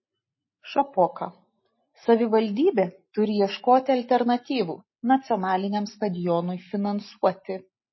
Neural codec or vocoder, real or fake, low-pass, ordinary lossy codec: none; real; 7.2 kHz; MP3, 24 kbps